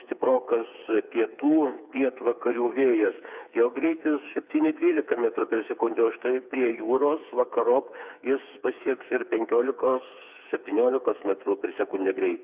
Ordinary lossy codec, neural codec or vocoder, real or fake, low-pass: Opus, 64 kbps; codec, 16 kHz, 4 kbps, FreqCodec, smaller model; fake; 3.6 kHz